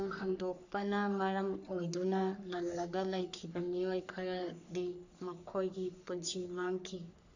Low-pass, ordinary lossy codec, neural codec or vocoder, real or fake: 7.2 kHz; none; codec, 44.1 kHz, 3.4 kbps, Pupu-Codec; fake